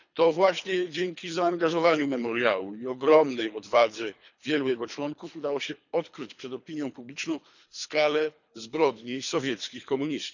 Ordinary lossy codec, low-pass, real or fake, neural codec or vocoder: none; 7.2 kHz; fake; codec, 24 kHz, 3 kbps, HILCodec